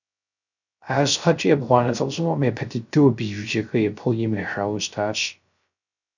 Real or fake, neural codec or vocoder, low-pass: fake; codec, 16 kHz, 0.3 kbps, FocalCodec; 7.2 kHz